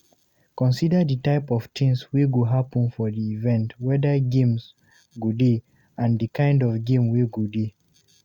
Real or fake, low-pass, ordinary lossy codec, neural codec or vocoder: real; 19.8 kHz; Opus, 64 kbps; none